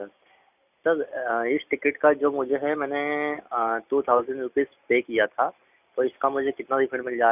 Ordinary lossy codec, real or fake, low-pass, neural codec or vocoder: none; real; 3.6 kHz; none